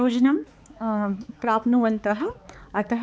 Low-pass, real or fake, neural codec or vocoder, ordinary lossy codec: none; fake; codec, 16 kHz, 4 kbps, X-Codec, HuBERT features, trained on balanced general audio; none